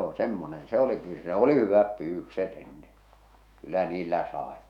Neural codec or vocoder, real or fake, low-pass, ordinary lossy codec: autoencoder, 48 kHz, 128 numbers a frame, DAC-VAE, trained on Japanese speech; fake; 19.8 kHz; none